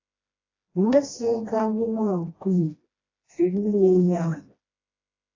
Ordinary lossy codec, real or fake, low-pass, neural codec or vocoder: AAC, 32 kbps; fake; 7.2 kHz; codec, 16 kHz, 1 kbps, FreqCodec, smaller model